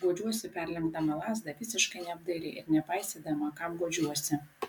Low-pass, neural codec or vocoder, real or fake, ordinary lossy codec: 19.8 kHz; none; real; MP3, 96 kbps